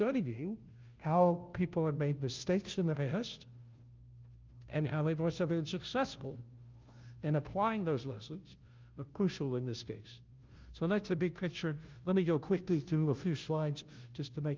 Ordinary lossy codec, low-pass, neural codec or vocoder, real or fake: Opus, 24 kbps; 7.2 kHz; codec, 16 kHz, 0.5 kbps, FunCodec, trained on Chinese and English, 25 frames a second; fake